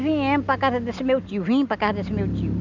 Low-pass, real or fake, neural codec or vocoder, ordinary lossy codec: 7.2 kHz; real; none; none